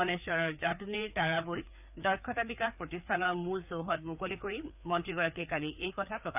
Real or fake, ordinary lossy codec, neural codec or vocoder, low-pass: fake; none; codec, 16 kHz, 4 kbps, FreqCodec, larger model; 3.6 kHz